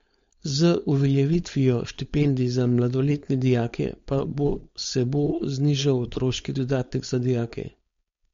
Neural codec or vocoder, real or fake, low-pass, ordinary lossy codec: codec, 16 kHz, 4.8 kbps, FACodec; fake; 7.2 kHz; MP3, 48 kbps